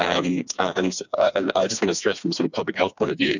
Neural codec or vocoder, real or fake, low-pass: codec, 16 kHz, 2 kbps, FreqCodec, smaller model; fake; 7.2 kHz